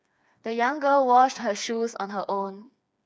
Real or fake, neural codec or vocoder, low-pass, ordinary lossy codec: fake; codec, 16 kHz, 4 kbps, FreqCodec, smaller model; none; none